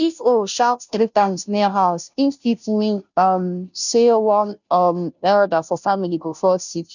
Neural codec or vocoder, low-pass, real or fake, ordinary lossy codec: codec, 16 kHz, 0.5 kbps, FunCodec, trained on Chinese and English, 25 frames a second; 7.2 kHz; fake; none